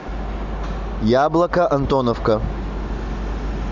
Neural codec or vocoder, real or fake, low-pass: none; real; 7.2 kHz